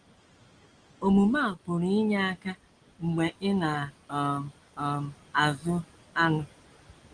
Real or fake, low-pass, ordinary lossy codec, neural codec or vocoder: real; 9.9 kHz; Opus, 24 kbps; none